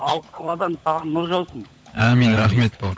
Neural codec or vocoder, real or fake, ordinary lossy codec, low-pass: codec, 16 kHz, 16 kbps, FunCodec, trained on LibriTTS, 50 frames a second; fake; none; none